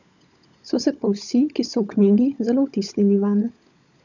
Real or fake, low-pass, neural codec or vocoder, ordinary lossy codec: fake; 7.2 kHz; codec, 16 kHz, 16 kbps, FunCodec, trained on LibriTTS, 50 frames a second; none